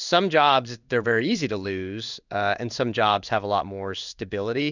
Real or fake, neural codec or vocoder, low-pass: fake; codec, 16 kHz in and 24 kHz out, 1 kbps, XY-Tokenizer; 7.2 kHz